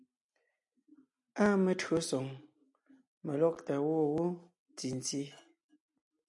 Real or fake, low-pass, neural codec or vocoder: real; 10.8 kHz; none